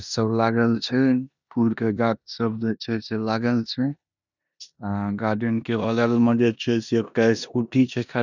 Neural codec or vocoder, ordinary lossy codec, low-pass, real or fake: codec, 16 kHz in and 24 kHz out, 0.9 kbps, LongCat-Audio-Codec, four codebook decoder; none; 7.2 kHz; fake